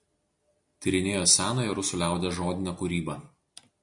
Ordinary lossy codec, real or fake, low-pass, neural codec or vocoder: MP3, 48 kbps; real; 10.8 kHz; none